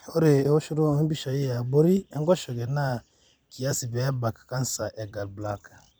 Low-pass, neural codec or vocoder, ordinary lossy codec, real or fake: none; vocoder, 44.1 kHz, 128 mel bands every 256 samples, BigVGAN v2; none; fake